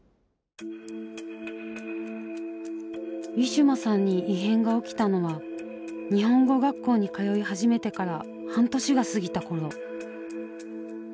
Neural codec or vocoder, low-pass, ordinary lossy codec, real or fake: none; none; none; real